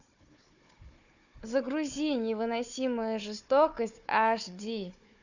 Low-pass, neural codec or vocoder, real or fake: 7.2 kHz; codec, 16 kHz, 4 kbps, FunCodec, trained on Chinese and English, 50 frames a second; fake